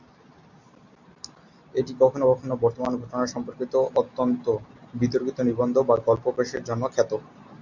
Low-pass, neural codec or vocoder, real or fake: 7.2 kHz; none; real